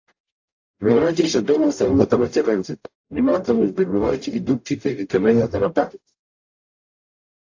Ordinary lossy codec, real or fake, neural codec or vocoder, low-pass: AAC, 48 kbps; fake; codec, 44.1 kHz, 0.9 kbps, DAC; 7.2 kHz